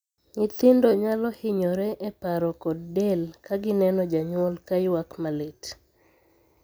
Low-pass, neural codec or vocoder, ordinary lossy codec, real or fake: none; none; none; real